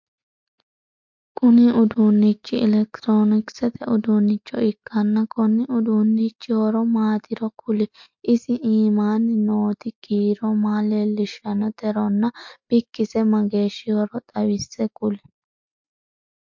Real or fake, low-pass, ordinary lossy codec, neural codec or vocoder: real; 7.2 kHz; MP3, 48 kbps; none